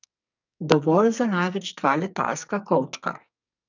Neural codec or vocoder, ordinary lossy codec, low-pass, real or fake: codec, 44.1 kHz, 2.6 kbps, SNAC; none; 7.2 kHz; fake